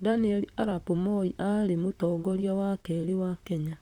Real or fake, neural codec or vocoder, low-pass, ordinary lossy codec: fake; vocoder, 44.1 kHz, 128 mel bands, Pupu-Vocoder; 19.8 kHz; Opus, 64 kbps